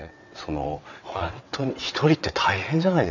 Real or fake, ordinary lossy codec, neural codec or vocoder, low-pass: fake; none; vocoder, 22.05 kHz, 80 mel bands, WaveNeXt; 7.2 kHz